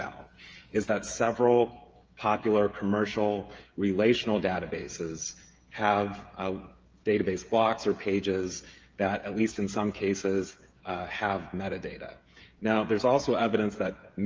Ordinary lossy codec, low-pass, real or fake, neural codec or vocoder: Opus, 24 kbps; 7.2 kHz; fake; codec, 16 kHz, 16 kbps, FreqCodec, smaller model